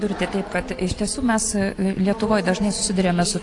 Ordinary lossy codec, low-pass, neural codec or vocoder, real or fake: AAC, 48 kbps; 10.8 kHz; none; real